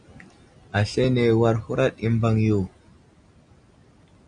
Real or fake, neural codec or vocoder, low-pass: real; none; 9.9 kHz